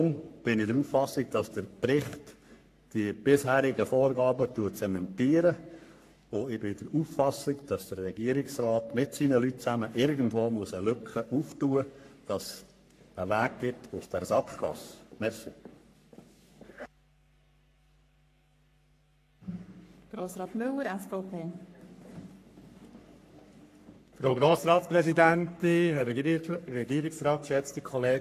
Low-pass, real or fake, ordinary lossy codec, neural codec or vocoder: 14.4 kHz; fake; AAC, 64 kbps; codec, 44.1 kHz, 3.4 kbps, Pupu-Codec